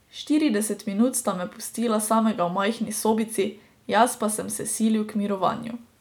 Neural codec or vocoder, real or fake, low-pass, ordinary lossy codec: none; real; 19.8 kHz; none